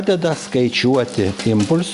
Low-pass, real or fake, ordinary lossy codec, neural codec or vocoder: 10.8 kHz; real; Opus, 64 kbps; none